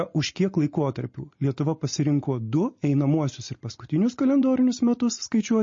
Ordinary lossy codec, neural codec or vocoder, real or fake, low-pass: MP3, 32 kbps; none; real; 7.2 kHz